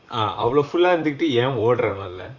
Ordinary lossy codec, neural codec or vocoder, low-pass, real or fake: Opus, 64 kbps; vocoder, 44.1 kHz, 128 mel bands, Pupu-Vocoder; 7.2 kHz; fake